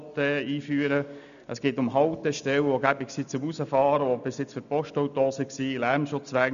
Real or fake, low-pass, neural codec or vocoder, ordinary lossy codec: real; 7.2 kHz; none; none